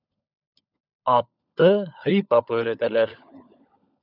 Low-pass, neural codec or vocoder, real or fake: 5.4 kHz; codec, 16 kHz, 16 kbps, FunCodec, trained on LibriTTS, 50 frames a second; fake